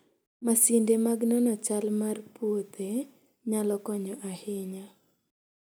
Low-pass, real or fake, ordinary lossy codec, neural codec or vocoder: none; real; none; none